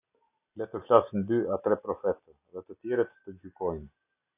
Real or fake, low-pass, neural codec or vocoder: real; 3.6 kHz; none